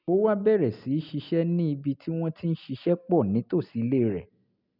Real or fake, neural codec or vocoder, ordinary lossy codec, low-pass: real; none; none; 5.4 kHz